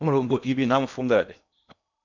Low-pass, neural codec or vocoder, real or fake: 7.2 kHz; codec, 16 kHz in and 24 kHz out, 0.8 kbps, FocalCodec, streaming, 65536 codes; fake